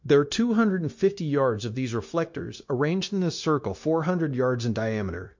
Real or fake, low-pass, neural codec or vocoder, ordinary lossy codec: fake; 7.2 kHz; codec, 16 kHz, 0.9 kbps, LongCat-Audio-Codec; MP3, 48 kbps